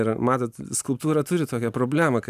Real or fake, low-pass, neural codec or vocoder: real; 14.4 kHz; none